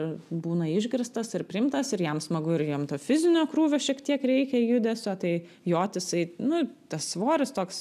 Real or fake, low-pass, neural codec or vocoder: real; 14.4 kHz; none